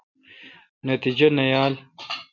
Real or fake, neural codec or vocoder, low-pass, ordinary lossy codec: real; none; 7.2 kHz; MP3, 64 kbps